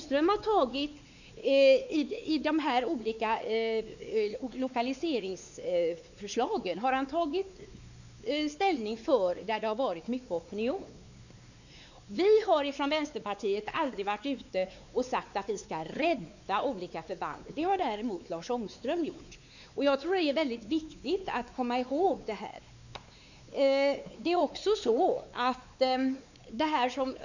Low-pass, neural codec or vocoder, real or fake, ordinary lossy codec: 7.2 kHz; codec, 16 kHz, 4 kbps, X-Codec, WavLM features, trained on Multilingual LibriSpeech; fake; none